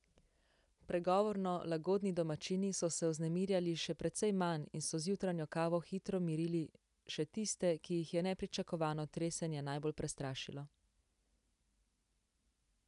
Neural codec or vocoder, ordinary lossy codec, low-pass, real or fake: none; none; 10.8 kHz; real